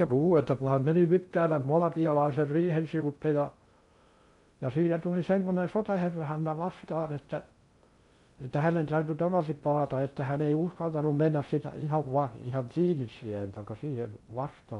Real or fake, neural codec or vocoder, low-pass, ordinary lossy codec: fake; codec, 16 kHz in and 24 kHz out, 0.6 kbps, FocalCodec, streaming, 2048 codes; 10.8 kHz; MP3, 64 kbps